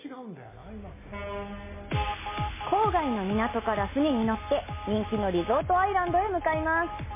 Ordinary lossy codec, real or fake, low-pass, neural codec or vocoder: MP3, 16 kbps; real; 3.6 kHz; none